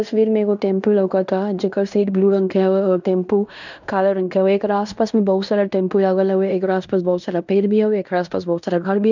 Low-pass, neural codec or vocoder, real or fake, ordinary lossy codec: 7.2 kHz; codec, 16 kHz in and 24 kHz out, 0.9 kbps, LongCat-Audio-Codec, fine tuned four codebook decoder; fake; none